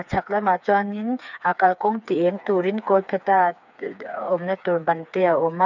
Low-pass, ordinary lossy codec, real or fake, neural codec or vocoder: 7.2 kHz; none; fake; codec, 16 kHz, 4 kbps, FreqCodec, smaller model